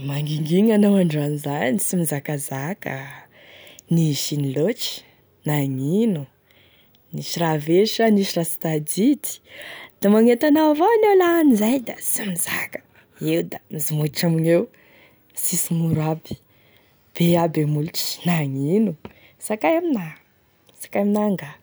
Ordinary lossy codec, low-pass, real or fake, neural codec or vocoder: none; none; real; none